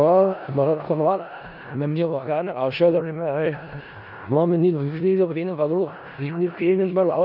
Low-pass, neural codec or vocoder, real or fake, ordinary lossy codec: 5.4 kHz; codec, 16 kHz in and 24 kHz out, 0.4 kbps, LongCat-Audio-Codec, four codebook decoder; fake; none